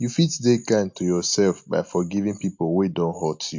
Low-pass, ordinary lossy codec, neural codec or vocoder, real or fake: 7.2 kHz; MP3, 48 kbps; none; real